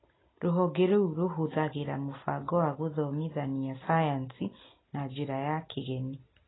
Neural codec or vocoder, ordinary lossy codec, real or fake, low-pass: none; AAC, 16 kbps; real; 7.2 kHz